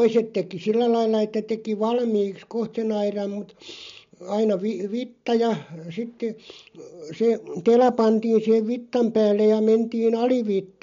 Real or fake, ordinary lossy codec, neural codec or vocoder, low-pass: real; MP3, 48 kbps; none; 7.2 kHz